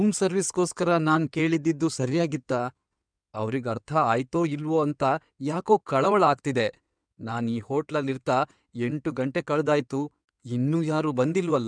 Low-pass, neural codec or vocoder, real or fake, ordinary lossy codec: 9.9 kHz; codec, 16 kHz in and 24 kHz out, 2.2 kbps, FireRedTTS-2 codec; fake; none